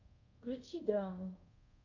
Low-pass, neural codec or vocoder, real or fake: 7.2 kHz; codec, 24 kHz, 0.5 kbps, DualCodec; fake